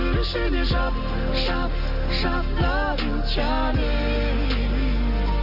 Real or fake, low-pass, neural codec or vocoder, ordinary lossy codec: real; 5.4 kHz; none; none